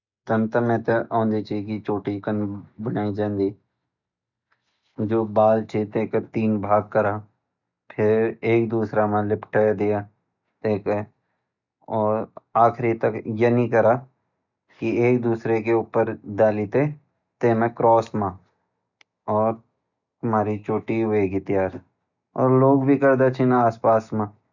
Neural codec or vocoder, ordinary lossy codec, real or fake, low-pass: none; none; real; 7.2 kHz